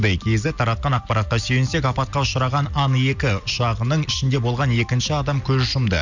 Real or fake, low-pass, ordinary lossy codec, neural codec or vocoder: real; 7.2 kHz; none; none